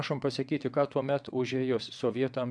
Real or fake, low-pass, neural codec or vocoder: fake; 9.9 kHz; vocoder, 22.05 kHz, 80 mel bands, WaveNeXt